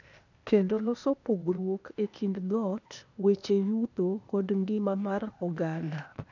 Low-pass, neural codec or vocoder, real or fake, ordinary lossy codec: 7.2 kHz; codec, 16 kHz, 0.8 kbps, ZipCodec; fake; none